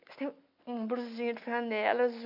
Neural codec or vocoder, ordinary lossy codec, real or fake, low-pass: none; MP3, 48 kbps; real; 5.4 kHz